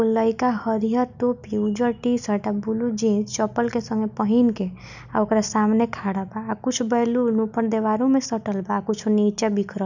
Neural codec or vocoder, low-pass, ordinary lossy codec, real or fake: none; 7.2 kHz; none; real